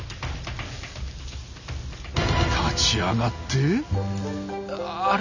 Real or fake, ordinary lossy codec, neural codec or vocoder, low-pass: real; none; none; 7.2 kHz